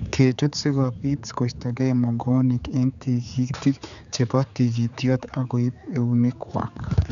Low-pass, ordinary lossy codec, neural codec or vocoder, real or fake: 7.2 kHz; none; codec, 16 kHz, 4 kbps, X-Codec, HuBERT features, trained on general audio; fake